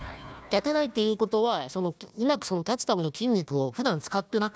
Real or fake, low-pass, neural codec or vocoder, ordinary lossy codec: fake; none; codec, 16 kHz, 1 kbps, FunCodec, trained on Chinese and English, 50 frames a second; none